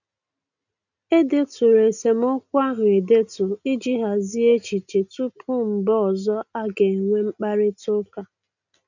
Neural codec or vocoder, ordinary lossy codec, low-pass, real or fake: none; none; 7.2 kHz; real